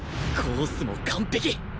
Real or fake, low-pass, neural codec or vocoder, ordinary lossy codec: real; none; none; none